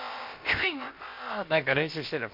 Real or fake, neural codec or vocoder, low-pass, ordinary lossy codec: fake; codec, 16 kHz, about 1 kbps, DyCAST, with the encoder's durations; 5.4 kHz; MP3, 32 kbps